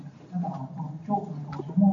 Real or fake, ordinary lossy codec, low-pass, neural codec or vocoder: real; AAC, 48 kbps; 7.2 kHz; none